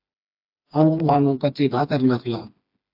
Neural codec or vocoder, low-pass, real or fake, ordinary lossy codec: codec, 16 kHz, 2 kbps, FreqCodec, smaller model; 5.4 kHz; fake; AAC, 48 kbps